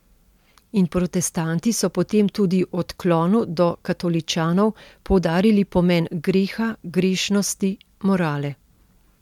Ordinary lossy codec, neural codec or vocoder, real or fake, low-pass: MP3, 96 kbps; none; real; 19.8 kHz